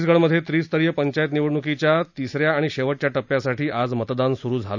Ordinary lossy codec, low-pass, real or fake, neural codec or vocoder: none; 7.2 kHz; real; none